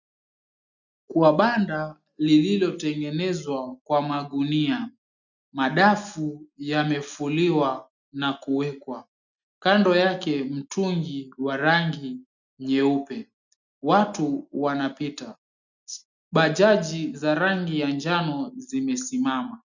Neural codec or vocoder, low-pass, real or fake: none; 7.2 kHz; real